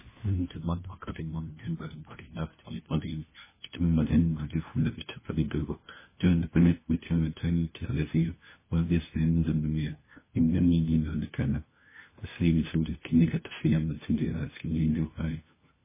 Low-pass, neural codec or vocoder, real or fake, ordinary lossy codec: 3.6 kHz; codec, 16 kHz, 1 kbps, FunCodec, trained on LibriTTS, 50 frames a second; fake; MP3, 16 kbps